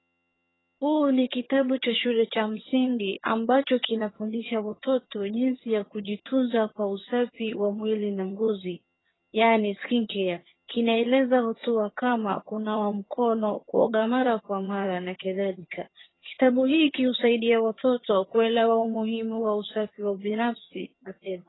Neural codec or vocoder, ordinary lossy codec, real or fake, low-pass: vocoder, 22.05 kHz, 80 mel bands, HiFi-GAN; AAC, 16 kbps; fake; 7.2 kHz